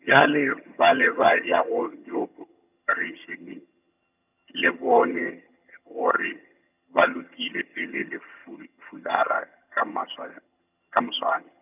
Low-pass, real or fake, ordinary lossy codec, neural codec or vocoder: 3.6 kHz; fake; none; vocoder, 22.05 kHz, 80 mel bands, HiFi-GAN